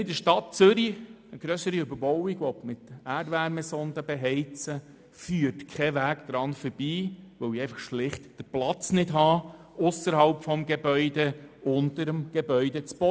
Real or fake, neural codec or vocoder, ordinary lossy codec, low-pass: real; none; none; none